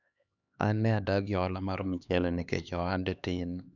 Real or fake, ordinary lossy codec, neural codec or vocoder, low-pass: fake; none; codec, 16 kHz, 2 kbps, X-Codec, HuBERT features, trained on LibriSpeech; 7.2 kHz